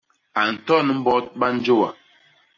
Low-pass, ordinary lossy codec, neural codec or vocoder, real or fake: 7.2 kHz; MP3, 32 kbps; none; real